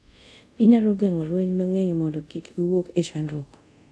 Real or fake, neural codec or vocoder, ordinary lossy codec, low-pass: fake; codec, 24 kHz, 0.5 kbps, DualCodec; none; none